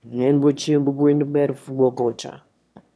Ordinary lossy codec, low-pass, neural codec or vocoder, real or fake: none; none; autoencoder, 22.05 kHz, a latent of 192 numbers a frame, VITS, trained on one speaker; fake